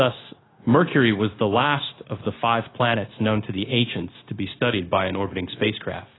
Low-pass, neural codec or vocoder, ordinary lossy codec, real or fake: 7.2 kHz; codec, 16 kHz, 0.9 kbps, LongCat-Audio-Codec; AAC, 16 kbps; fake